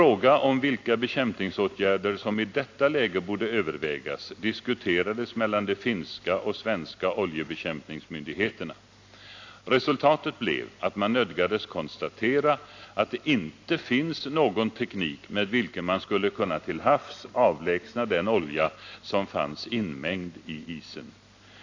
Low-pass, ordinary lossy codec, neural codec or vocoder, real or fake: 7.2 kHz; AAC, 48 kbps; none; real